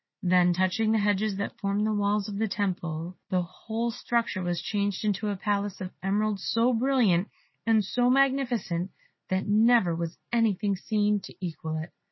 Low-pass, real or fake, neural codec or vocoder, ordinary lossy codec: 7.2 kHz; real; none; MP3, 24 kbps